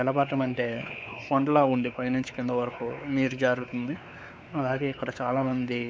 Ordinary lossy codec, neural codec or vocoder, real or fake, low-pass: none; codec, 16 kHz, 4 kbps, X-Codec, WavLM features, trained on Multilingual LibriSpeech; fake; none